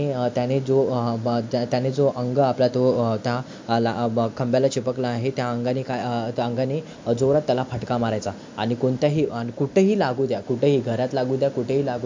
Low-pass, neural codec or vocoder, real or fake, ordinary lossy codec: 7.2 kHz; none; real; MP3, 48 kbps